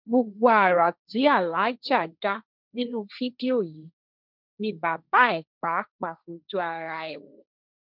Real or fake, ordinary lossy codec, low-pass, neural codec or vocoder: fake; none; 5.4 kHz; codec, 16 kHz, 1.1 kbps, Voila-Tokenizer